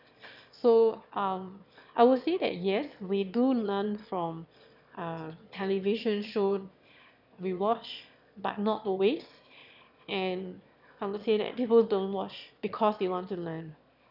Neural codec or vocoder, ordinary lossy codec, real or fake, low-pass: autoencoder, 22.05 kHz, a latent of 192 numbers a frame, VITS, trained on one speaker; none; fake; 5.4 kHz